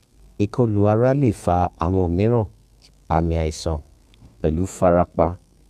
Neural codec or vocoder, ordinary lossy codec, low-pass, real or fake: codec, 32 kHz, 1.9 kbps, SNAC; none; 14.4 kHz; fake